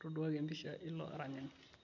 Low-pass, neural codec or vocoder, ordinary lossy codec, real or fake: 7.2 kHz; none; none; real